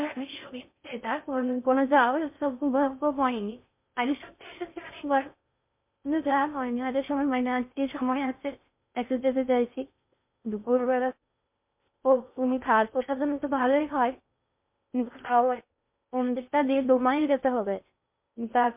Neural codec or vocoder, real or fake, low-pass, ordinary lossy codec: codec, 16 kHz in and 24 kHz out, 0.6 kbps, FocalCodec, streaming, 4096 codes; fake; 3.6 kHz; MP3, 32 kbps